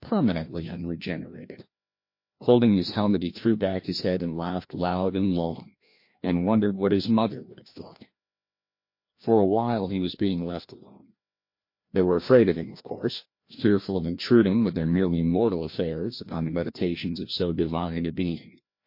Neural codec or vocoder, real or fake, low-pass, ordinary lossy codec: codec, 16 kHz, 1 kbps, FreqCodec, larger model; fake; 5.4 kHz; MP3, 32 kbps